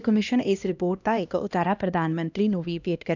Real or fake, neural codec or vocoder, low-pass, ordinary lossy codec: fake; codec, 16 kHz, 1 kbps, X-Codec, HuBERT features, trained on LibriSpeech; 7.2 kHz; none